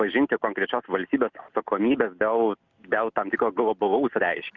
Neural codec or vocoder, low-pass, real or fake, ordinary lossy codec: none; 7.2 kHz; real; Opus, 64 kbps